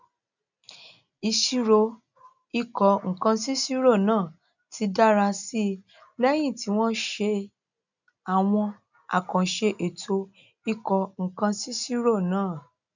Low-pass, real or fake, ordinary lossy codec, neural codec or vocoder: 7.2 kHz; real; none; none